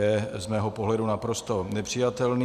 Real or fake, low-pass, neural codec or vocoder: real; 14.4 kHz; none